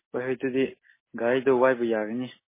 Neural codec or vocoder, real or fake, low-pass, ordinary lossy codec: none; real; 3.6 kHz; MP3, 16 kbps